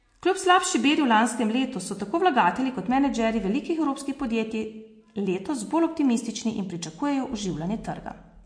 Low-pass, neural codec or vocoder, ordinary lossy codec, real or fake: 9.9 kHz; none; MP3, 48 kbps; real